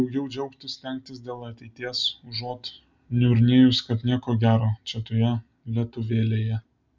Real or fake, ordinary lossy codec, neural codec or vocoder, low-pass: real; MP3, 64 kbps; none; 7.2 kHz